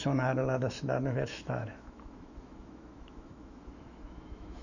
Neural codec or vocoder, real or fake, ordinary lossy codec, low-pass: autoencoder, 48 kHz, 128 numbers a frame, DAC-VAE, trained on Japanese speech; fake; none; 7.2 kHz